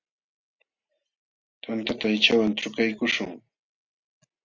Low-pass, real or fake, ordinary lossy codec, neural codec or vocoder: 7.2 kHz; real; Opus, 64 kbps; none